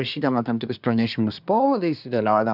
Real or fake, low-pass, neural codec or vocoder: fake; 5.4 kHz; codec, 24 kHz, 1 kbps, SNAC